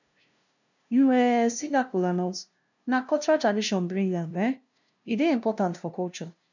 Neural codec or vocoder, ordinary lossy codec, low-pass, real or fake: codec, 16 kHz, 0.5 kbps, FunCodec, trained on LibriTTS, 25 frames a second; none; 7.2 kHz; fake